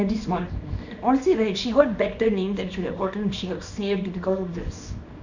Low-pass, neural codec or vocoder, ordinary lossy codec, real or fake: 7.2 kHz; codec, 24 kHz, 0.9 kbps, WavTokenizer, small release; none; fake